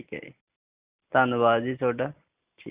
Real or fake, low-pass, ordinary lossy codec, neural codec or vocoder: real; 3.6 kHz; Opus, 64 kbps; none